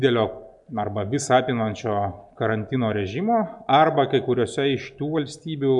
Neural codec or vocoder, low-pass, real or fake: none; 10.8 kHz; real